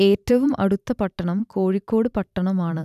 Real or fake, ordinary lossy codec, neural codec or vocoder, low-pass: fake; none; vocoder, 44.1 kHz, 128 mel bands every 512 samples, BigVGAN v2; 14.4 kHz